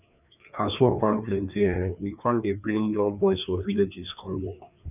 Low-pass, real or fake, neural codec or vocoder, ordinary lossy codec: 3.6 kHz; fake; codec, 16 kHz, 2 kbps, FreqCodec, larger model; none